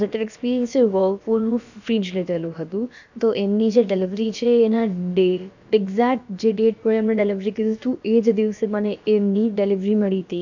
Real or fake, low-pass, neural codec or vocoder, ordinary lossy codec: fake; 7.2 kHz; codec, 16 kHz, about 1 kbps, DyCAST, with the encoder's durations; none